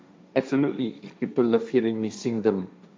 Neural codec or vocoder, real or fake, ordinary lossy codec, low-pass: codec, 16 kHz, 1.1 kbps, Voila-Tokenizer; fake; none; none